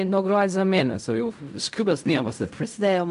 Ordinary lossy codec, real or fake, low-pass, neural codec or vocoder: AAC, 96 kbps; fake; 10.8 kHz; codec, 16 kHz in and 24 kHz out, 0.4 kbps, LongCat-Audio-Codec, fine tuned four codebook decoder